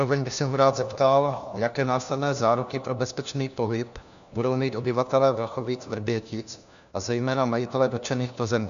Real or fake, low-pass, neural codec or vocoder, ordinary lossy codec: fake; 7.2 kHz; codec, 16 kHz, 1 kbps, FunCodec, trained on LibriTTS, 50 frames a second; MP3, 96 kbps